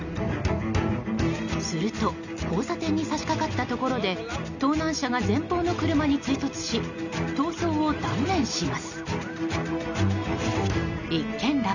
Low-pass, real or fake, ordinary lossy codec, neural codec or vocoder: 7.2 kHz; real; none; none